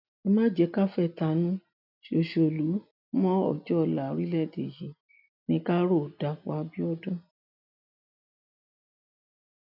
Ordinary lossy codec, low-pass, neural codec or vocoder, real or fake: none; 5.4 kHz; none; real